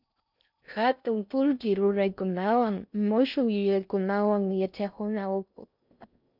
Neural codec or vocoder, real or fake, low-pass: codec, 16 kHz in and 24 kHz out, 0.6 kbps, FocalCodec, streaming, 4096 codes; fake; 5.4 kHz